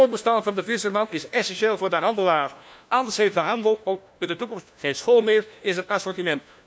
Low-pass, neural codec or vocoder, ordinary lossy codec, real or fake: none; codec, 16 kHz, 1 kbps, FunCodec, trained on LibriTTS, 50 frames a second; none; fake